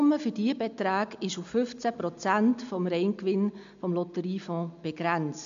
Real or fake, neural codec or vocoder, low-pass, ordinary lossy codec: real; none; 7.2 kHz; MP3, 64 kbps